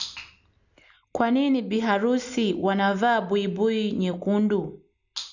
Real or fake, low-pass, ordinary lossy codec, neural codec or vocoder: real; 7.2 kHz; none; none